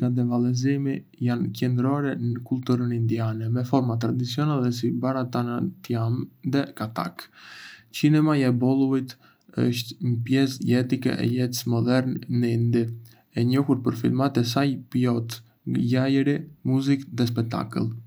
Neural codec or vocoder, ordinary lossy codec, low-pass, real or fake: none; none; none; real